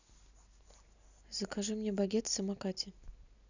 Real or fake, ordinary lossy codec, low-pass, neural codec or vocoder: real; none; 7.2 kHz; none